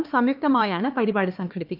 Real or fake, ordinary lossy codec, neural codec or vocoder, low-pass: fake; Opus, 24 kbps; codec, 16 kHz, 2 kbps, X-Codec, HuBERT features, trained on LibriSpeech; 5.4 kHz